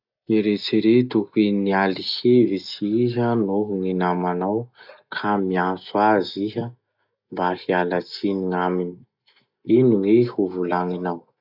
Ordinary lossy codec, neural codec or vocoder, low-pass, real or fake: none; none; 5.4 kHz; real